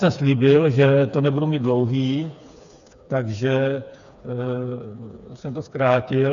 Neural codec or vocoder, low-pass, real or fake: codec, 16 kHz, 4 kbps, FreqCodec, smaller model; 7.2 kHz; fake